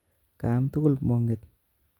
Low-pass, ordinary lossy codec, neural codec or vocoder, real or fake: 19.8 kHz; Opus, 32 kbps; none; real